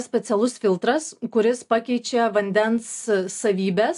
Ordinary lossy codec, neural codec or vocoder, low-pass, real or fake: AAC, 64 kbps; none; 10.8 kHz; real